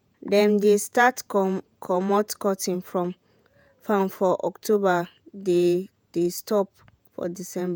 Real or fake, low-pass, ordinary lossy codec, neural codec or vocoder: fake; none; none; vocoder, 48 kHz, 128 mel bands, Vocos